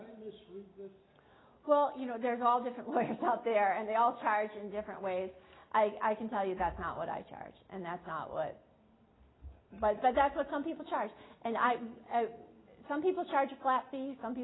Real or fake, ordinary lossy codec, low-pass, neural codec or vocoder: real; AAC, 16 kbps; 7.2 kHz; none